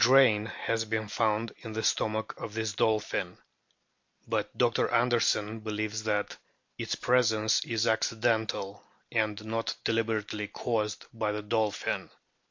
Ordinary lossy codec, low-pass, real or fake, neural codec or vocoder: MP3, 48 kbps; 7.2 kHz; real; none